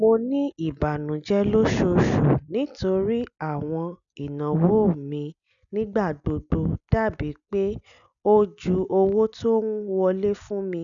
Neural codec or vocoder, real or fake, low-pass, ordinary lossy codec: none; real; 7.2 kHz; none